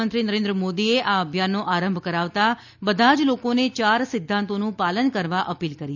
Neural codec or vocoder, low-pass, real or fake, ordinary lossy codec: none; 7.2 kHz; real; none